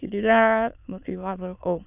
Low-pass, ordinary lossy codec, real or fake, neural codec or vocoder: 3.6 kHz; none; fake; autoencoder, 22.05 kHz, a latent of 192 numbers a frame, VITS, trained on many speakers